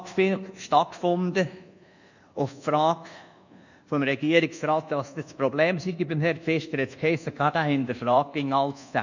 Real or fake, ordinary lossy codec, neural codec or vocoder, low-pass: fake; none; codec, 24 kHz, 1.2 kbps, DualCodec; 7.2 kHz